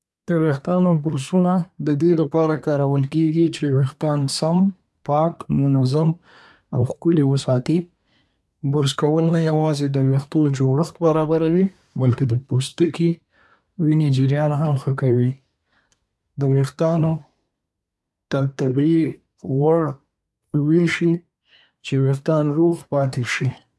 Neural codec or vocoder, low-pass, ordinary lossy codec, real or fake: codec, 24 kHz, 1 kbps, SNAC; none; none; fake